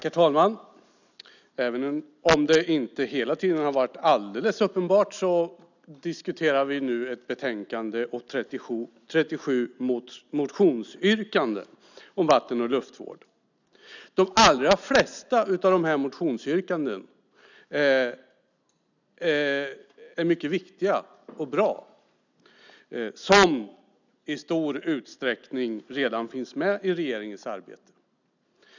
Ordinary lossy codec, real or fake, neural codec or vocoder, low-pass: none; real; none; 7.2 kHz